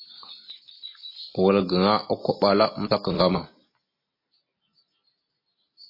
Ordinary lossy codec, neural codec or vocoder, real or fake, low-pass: MP3, 24 kbps; none; real; 5.4 kHz